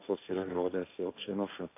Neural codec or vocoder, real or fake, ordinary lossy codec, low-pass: codec, 16 kHz, 1.1 kbps, Voila-Tokenizer; fake; AAC, 24 kbps; 3.6 kHz